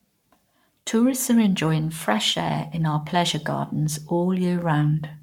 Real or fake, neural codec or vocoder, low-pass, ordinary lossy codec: fake; codec, 44.1 kHz, 7.8 kbps, Pupu-Codec; 19.8 kHz; MP3, 96 kbps